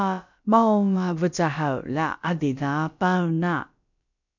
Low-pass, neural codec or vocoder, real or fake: 7.2 kHz; codec, 16 kHz, about 1 kbps, DyCAST, with the encoder's durations; fake